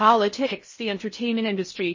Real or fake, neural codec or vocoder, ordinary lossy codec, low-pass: fake; codec, 16 kHz in and 24 kHz out, 0.6 kbps, FocalCodec, streaming, 4096 codes; MP3, 32 kbps; 7.2 kHz